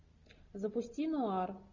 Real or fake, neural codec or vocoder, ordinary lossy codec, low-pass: real; none; Opus, 64 kbps; 7.2 kHz